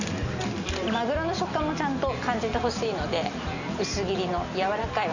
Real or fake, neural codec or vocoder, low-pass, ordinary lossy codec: real; none; 7.2 kHz; none